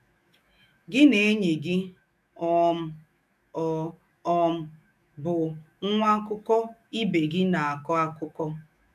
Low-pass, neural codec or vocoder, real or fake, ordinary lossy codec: 14.4 kHz; autoencoder, 48 kHz, 128 numbers a frame, DAC-VAE, trained on Japanese speech; fake; MP3, 96 kbps